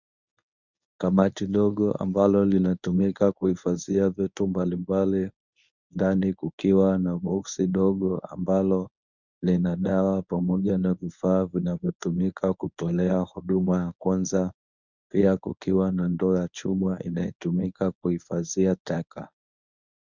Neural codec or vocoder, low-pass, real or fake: codec, 24 kHz, 0.9 kbps, WavTokenizer, medium speech release version 1; 7.2 kHz; fake